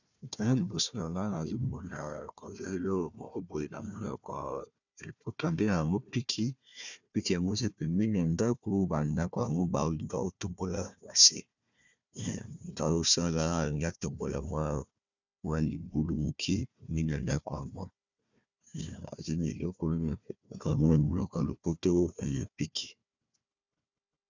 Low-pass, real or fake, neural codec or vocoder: 7.2 kHz; fake; codec, 16 kHz, 1 kbps, FunCodec, trained on Chinese and English, 50 frames a second